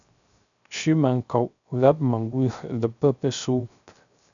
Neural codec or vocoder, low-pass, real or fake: codec, 16 kHz, 0.3 kbps, FocalCodec; 7.2 kHz; fake